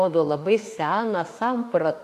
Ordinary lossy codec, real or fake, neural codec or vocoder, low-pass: AAC, 64 kbps; fake; autoencoder, 48 kHz, 32 numbers a frame, DAC-VAE, trained on Japanese speech; 14.4 kHz